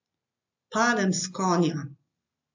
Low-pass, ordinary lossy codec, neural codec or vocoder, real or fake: 7.2 kHz; AAC, 48 kbps; none; real